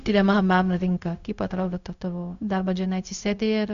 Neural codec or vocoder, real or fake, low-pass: codec, 16 kHz, 0.4 kbps, LongCat-Audio-Codec; fake; 7.2 kHz